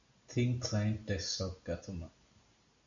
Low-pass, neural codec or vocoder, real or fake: 7.2 kHz; none; real